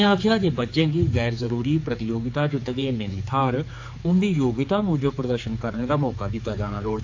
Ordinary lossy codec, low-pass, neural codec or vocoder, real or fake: AAC, 48 kbps; 7.2 kHz; codec, 16 kHz, 4 kbps, X-Codec, HuBERT features, trained on general audio; fake